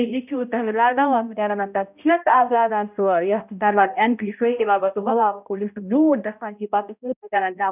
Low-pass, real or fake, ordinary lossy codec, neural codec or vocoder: 3.6 kHz; fake; none; codec, 16 kHz, 0.5 kbps, X-Codec, HuBERT features, trained on balanced general audio